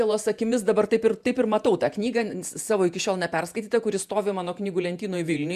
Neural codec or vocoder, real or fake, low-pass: vocoder, 48 kHz, 128 mel bands, Vocos; fake; 14.4 kHz